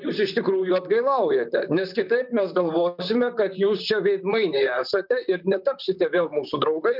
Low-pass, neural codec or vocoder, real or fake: 5.4 kHz; vocoder, 44.1 kHz, 128 mel bands, Pupu-Vocoder; fake